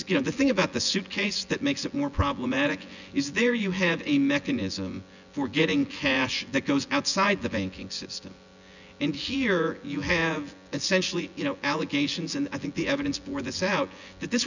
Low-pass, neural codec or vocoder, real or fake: 7.2 kHz; vocoder, 24 kHz, 100 mel bands, Vocos; fake